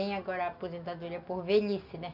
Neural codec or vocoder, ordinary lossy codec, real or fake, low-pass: none; none; real; 5.4 kHz